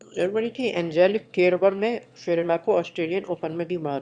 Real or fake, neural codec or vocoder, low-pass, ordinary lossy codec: fake; autoencoder, 22.05 kHz, a latent of 192 numbers a frame, VITS, trained on one speaker; 9.9 kHz; Opus, 64 kbps